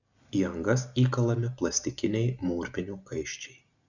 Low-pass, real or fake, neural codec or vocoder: 7.2 kHz; real; none